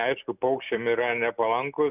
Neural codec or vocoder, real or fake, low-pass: none; real; 3.6 kHz